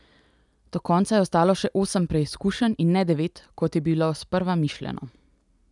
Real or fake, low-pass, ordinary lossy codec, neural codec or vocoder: real; 10.8 kHz; none; none